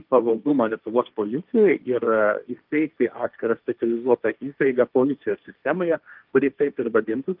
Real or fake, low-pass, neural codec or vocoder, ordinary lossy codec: fake; 5.4 kHz; codec, 16 kHz, 1.1 kbps, Voila-Tokenizer; Opus, 24 kbps